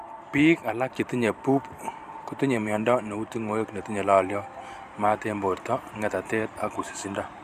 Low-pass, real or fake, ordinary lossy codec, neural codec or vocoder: 14.4 kHz; fake; none; vocoder, 44.1 kHz, 128 mel bands every 512 samples, BigVGAN v2